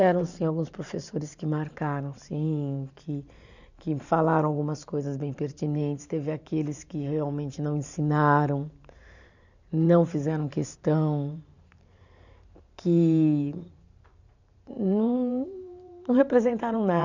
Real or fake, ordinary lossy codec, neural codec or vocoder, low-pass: fake; none; vocoder, 44.1 kHz, 128 mel bands every 512 samples, BigVGAN v2; 7.2 kHz